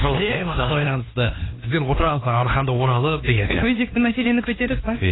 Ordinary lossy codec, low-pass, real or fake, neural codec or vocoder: AAC, 16 kbps; 7.2 kHz; fake; codec, 16 kHz, 2 kbps, X-Codec, HuBERT features, trained on LibriSpeech